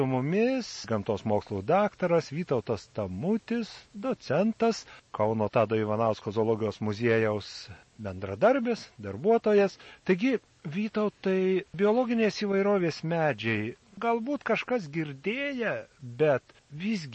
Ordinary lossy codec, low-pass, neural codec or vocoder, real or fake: MP3, 32 kbps; 10.8 kHz; none; real